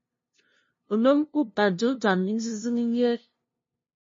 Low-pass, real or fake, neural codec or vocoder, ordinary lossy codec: 7.2 kHz; fake; codec, 16 kHz, 0.5 kbps, FunCodec, trained on LibriTTS, 25 frames a second; MP3, 32 kbps